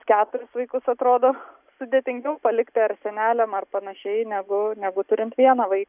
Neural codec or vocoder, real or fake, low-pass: none; real; 3.6 kHz